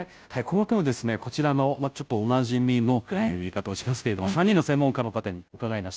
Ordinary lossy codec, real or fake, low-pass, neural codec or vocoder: none; fake; none; codec, 16 kHz, 0.5 kbps, FunCodec, trained on Chinese and English, 25 frames a second